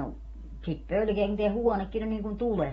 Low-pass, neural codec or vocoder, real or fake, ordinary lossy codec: 19.8 kHz; none; real; AAC, 24 kbps